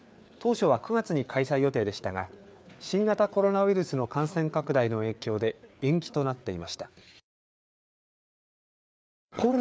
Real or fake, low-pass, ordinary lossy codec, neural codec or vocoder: fake; none; none; codec, 16 kHz, 4 kbps, FreqCodec, larger model